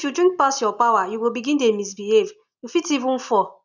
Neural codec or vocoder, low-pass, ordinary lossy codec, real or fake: none; 7.2 kHz; none; real